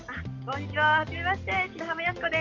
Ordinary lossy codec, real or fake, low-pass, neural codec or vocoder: Opus, 32 kbps; real; 7.2 kHz; none